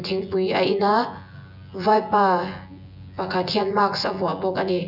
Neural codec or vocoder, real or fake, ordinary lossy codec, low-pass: vocoder, 24 kHz, 100 mel bands, Vocos; fake; none; 5.4 kHz